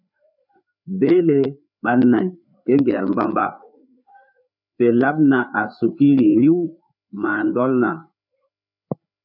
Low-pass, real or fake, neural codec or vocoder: 5.4 kHz; fake; codec, 16 kHz, 4 kbps, FreqCodec, larger model